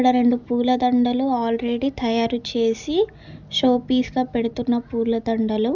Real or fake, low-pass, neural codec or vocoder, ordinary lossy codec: real; 7.2 kHz; none; none